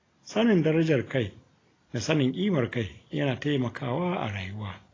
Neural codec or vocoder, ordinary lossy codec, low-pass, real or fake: none; AAC, 32 kbps; 7.2 kHz; real